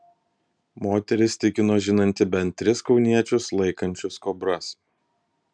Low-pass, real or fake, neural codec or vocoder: 9.9 kHz; real; none